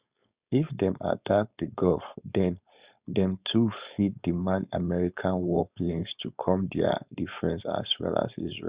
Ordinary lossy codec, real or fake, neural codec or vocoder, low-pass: Opus, 64 kbps; fake; codec, 16 kHz, 4.8 kbps, FACodec; 3.6 kHz